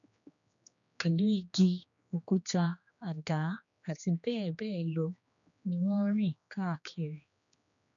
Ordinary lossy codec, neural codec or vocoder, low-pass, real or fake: none; codec, 16 kHz, 2 kbps, X-Codec, HuBERT features, trained on general audio; 7.2 kHz; fake